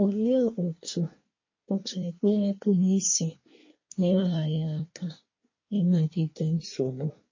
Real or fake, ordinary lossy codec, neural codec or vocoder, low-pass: fake; MP3, 32 kbps; codec, 24 kHz, 1 kbps, SNAC; 7.2 kHz